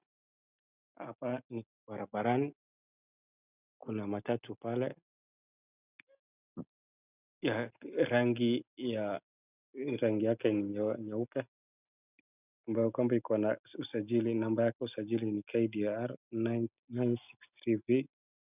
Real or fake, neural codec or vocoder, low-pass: real; none; 3.6 kHz